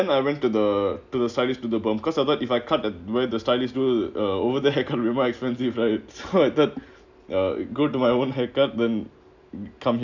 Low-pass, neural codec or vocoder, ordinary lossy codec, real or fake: 7.2 kHz; none; none; real